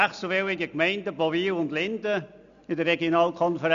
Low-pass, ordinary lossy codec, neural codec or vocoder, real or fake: 7.2 kHz; none; none; real